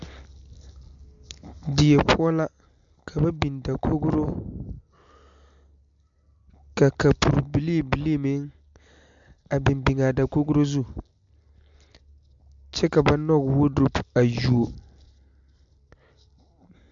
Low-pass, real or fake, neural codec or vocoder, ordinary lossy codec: 7.2 kHz; real; none; MP3, 64 kbps